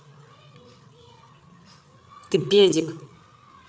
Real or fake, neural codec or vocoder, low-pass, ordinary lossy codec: fake; codec, 16 kHz, 8 kbps, FreqCodec, larger model; none; none